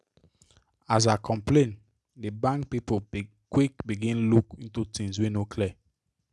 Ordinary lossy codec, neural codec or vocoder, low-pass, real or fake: none; none; none; real